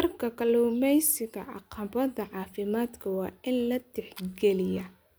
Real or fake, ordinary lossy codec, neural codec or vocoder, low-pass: real; none; none; none